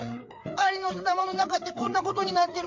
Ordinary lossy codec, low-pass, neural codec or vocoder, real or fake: MP3, 48 kbps; 7.2 kHz; codec, 16 kHz, 4 kbps, FreqCodec, larger model; fake